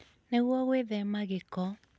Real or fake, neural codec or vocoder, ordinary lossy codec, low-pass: real; none; none; none